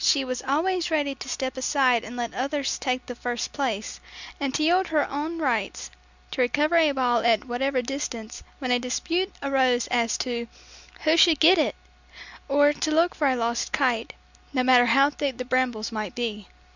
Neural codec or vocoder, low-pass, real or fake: none; 7.2 kHz; real